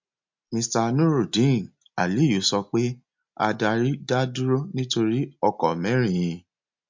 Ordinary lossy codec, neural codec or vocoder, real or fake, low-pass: MP3, 64 kbps; none; real; 7.2 kHz